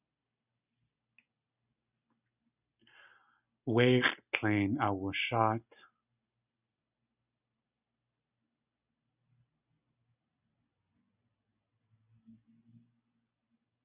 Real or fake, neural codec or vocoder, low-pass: real; none; 3.6 kHz